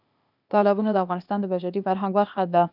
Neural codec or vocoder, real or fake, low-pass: codec, 16 kHz, 0.8 kbps, ZipCodec; fake; 5.4 kHz